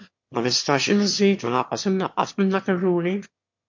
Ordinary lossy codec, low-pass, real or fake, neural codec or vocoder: MP3, 48 kbps; 7.2 kHz; fake; autoencoder, 22.05 kHz, a latent of 192 numbers a frame, VITS, trained on one speaker